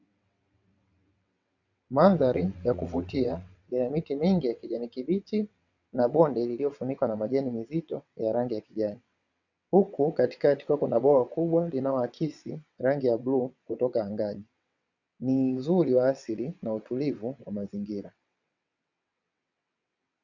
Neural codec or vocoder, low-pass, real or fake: vocoder, 22.05 kHz, 80 mel bands, WaveNeXt; 7.2 kHz; fake